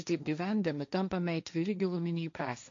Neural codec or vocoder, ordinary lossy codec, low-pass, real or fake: codec, 16 kHz, 1.1 kbps, Voila-Tokenizer; MP3, 48 kbps; 7.2 kHz; fake